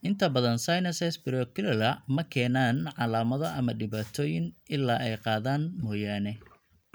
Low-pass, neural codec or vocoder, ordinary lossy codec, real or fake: none; none; none; real